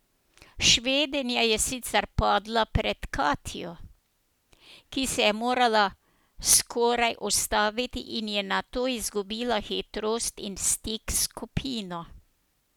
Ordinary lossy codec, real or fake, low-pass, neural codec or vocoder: none; real; none; none